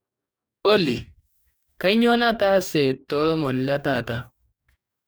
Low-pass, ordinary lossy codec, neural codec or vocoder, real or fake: none; none; codec, 44.1 kHz, 2.6 kbps, DAC; fake